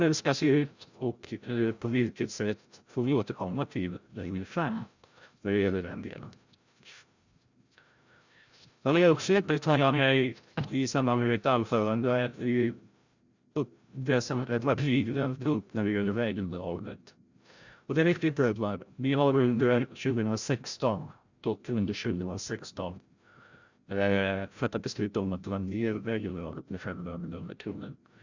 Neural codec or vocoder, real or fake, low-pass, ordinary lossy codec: codec, 16 kHz, 0.5 kbps, FreqCodec, larger model; fake; 7.2 kHz; Opus, 64 kbps